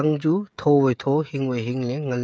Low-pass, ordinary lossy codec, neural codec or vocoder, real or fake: none; none; codec, 16 kHz, 16 kbps, FreqCodec, smaller model; fake